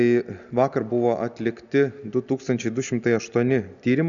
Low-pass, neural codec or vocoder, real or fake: 7.2 kHz; none; real